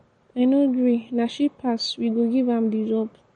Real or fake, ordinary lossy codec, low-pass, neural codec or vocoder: real; MP3, 48 kbps; 9.9 kHz; none